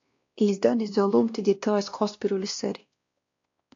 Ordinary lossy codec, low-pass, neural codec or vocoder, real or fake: AAC, 48 kbps; 7.2 kHz; codec, 16 kHz, 1 kbps, X-Codec, WavLM features, trained on Multilingual LibriSpeech; fake